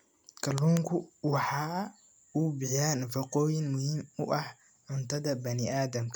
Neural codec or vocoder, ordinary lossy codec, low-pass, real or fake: none; none; none; real